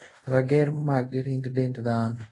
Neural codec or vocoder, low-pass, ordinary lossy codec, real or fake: codec, 24 kHz, 0.5 kbps, DualCodec; 10.8 kHz; AAC, 32 kbps; fake